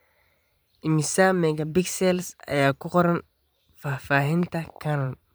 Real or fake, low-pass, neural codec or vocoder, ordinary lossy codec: fake; none; vocoder, 44.1 kHz, 128 mel bands every 512 samples, BigVGAN v2; none